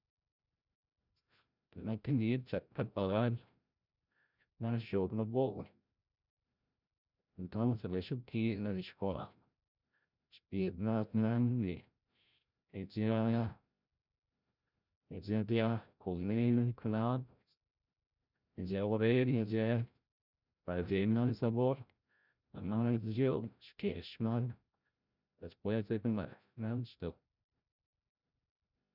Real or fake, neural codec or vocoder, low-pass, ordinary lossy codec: fake; codec, 16 kHz, 0.5 kbps, FreqCodec, larger model; 5.4 kHz; none